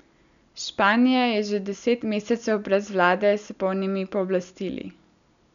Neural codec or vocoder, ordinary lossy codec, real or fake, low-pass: none; none; real; 7.2 kHz